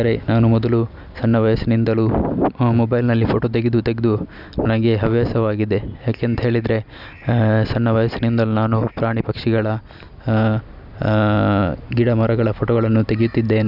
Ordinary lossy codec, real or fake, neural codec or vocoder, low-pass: none; real; none; 5.4 kHz